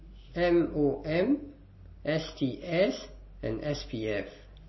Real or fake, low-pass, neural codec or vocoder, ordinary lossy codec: real; 7.2 kHz; none; MP3, 24 kbps